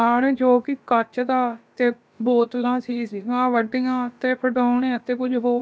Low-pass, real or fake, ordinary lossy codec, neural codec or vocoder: none; fake; none; codec, 16 kHz, about 1 kbps, DyCAST, with the encoder's durations